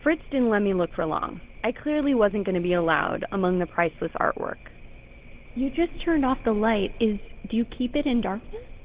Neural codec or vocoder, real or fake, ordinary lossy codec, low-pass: none; real; Opus, 16 kbps; 3.6 kHz